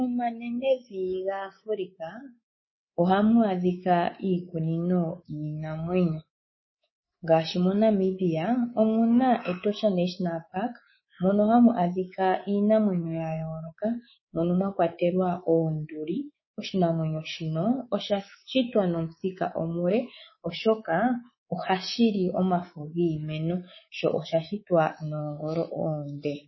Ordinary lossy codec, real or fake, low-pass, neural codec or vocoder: MP3, 24 kbps; fake; 7.2 kHz; codec, 24 kHz, 3.1 kbps, DualCodec